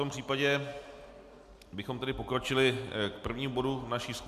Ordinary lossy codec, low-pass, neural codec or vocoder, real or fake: MP3, 96 kbps; 14.4 kHz; none; real